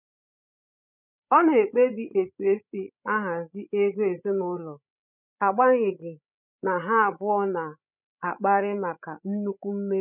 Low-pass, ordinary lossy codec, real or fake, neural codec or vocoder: 3.6 kHz; none; fake; codec, 16 kHz, 16 kbps, FreqCodec, larger model